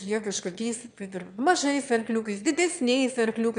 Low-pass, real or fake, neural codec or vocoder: 9.9 kHz; fake; autoencoder, 22.05 kHz, a latent of 192 numbers a frame, VITS, trained on one speaker